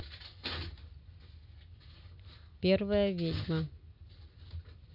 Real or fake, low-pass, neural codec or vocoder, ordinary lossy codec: real; 5.4 kHz; none; none